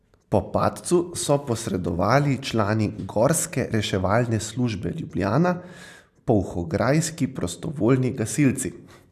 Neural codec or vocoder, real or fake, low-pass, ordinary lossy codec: none; real; 14.4 kHz; none